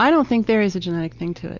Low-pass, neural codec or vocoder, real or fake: 7.2 kHz; none; real